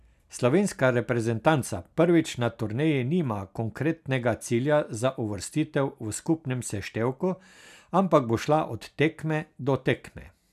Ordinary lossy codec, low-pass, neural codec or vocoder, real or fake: none; 14.4 kHz; none; real